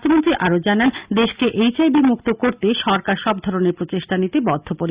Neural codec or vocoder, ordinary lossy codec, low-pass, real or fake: none; Opus, 64 kbps; 3.6 kHz; real